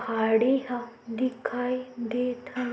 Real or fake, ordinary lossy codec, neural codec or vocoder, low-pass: real; none; none; none